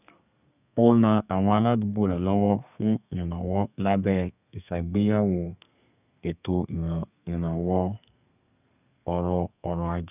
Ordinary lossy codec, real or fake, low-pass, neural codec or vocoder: none; fake; 3.6 kHz; codec, 32 kHz, 1.9 kbps, SNAC